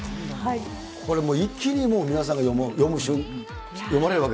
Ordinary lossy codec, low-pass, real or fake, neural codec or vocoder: none; none; real; none